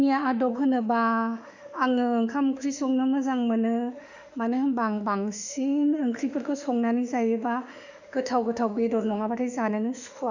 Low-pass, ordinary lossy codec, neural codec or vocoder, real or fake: 7.2 kHz; none; autoencoder, 48 kHz, 32 numbers a frame, DAC-VAE, trained on Japanese speech; fake